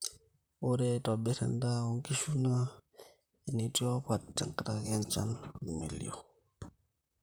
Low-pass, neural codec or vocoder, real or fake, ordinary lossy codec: none; vocoder, 44.1 kHz, 128 mel bands, Pupu-Vocoder; fake; none